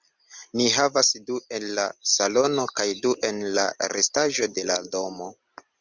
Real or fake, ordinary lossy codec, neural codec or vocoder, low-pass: real; Opus, 64 kbps; none; 7.2 kHz